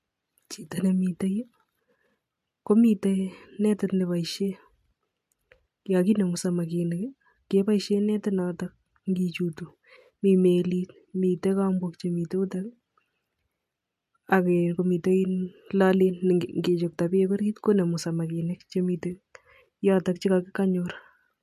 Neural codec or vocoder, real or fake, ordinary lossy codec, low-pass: none; real; MP3, 64 kbps; 14.4 kHz